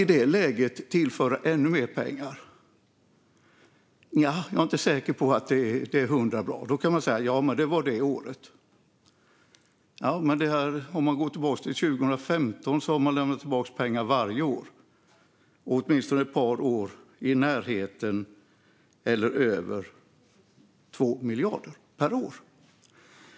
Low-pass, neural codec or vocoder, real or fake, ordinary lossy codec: none; none; real; none